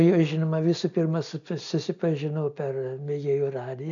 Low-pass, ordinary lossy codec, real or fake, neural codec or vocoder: 7.2 kHz; AAC, 64 kbps; real; none